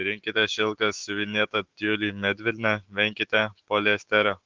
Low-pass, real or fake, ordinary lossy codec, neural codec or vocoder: 7.2 kHz; fake; Opus, 16 kbps; autoencoder, 48 kHz, 128 numbers a frame, DAC-VAE, trained on Japanese speech